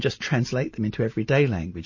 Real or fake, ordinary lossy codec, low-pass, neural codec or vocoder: real; MP3, 32 kbps; 7.2 kHz; none